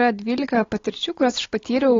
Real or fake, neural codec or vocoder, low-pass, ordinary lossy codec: real; none; 7.2 kHz; AAC, 32 kbps